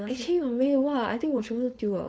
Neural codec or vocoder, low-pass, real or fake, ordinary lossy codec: codec, 16 kHz, 4.8 kbps, FACodec; none; fake; none